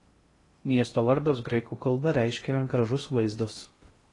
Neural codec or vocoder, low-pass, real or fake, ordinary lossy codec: codec, 16 kHz in and 24 kHz out, 0.6 kbps, FocalCodec, streaming, 2048 codes; 10.8 kHz; fake; AAC, 32 kbps